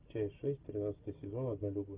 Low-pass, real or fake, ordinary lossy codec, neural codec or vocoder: 3.6 kHz; real; Opus, 24 kbps; none